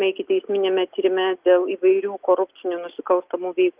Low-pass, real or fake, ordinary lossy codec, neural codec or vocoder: 3.6 kHz; real; Opus, 32 kbps; none